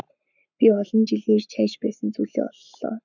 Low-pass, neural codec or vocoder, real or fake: 7.2 kHz; codec, 44.1 kHz, 7.8 kbps, Pupu-Codec; fake